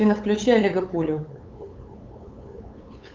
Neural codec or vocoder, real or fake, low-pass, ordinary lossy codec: codec, 16 kHz, 8 kbps, FunCodec, trained on LibriTTS, 25 frames a second; fake; 7.2 kHz; Opus, 24 kbps